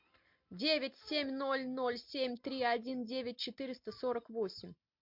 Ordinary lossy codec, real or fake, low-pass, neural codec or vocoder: MP3, 48 kbps; real; 5.4 kHz; none